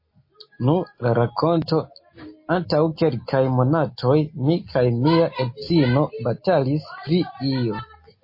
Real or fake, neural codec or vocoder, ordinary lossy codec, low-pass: real; none; MP3, 24 kbps; 5.4 kHz